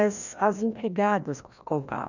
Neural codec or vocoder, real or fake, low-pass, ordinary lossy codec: codec, 16 kHz, 1 kbps, FreqCodec, larger model; fake; 7.2 kHz; none